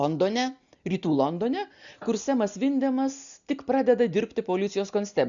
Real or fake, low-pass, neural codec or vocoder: real; 7.2 kHz; none